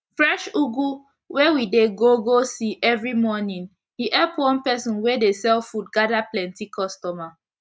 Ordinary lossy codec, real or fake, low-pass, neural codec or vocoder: none; real; none; none